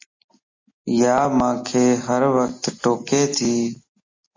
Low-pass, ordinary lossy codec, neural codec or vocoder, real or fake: 7.2 kHz; MP3, 32 kbps; none; real